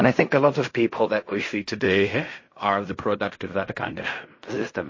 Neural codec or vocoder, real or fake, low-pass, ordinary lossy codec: codec, 16 kHz in and 24 kHz out, 0.4 kbps, LongCat-Audio-Codec, fine tuned four codebook decoder; fake; 7.2 kHz; MP3, 32 kbps